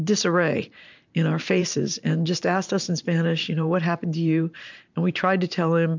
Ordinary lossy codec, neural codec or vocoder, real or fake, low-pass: MP3, 64 kbps; vocoder, 44.1 kHz, 128 mel bands every 512 samples, BigVGAN v2; fake; 7.2 kHz